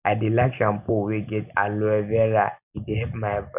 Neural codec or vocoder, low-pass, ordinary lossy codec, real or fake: none; 3.6 kHz; none; real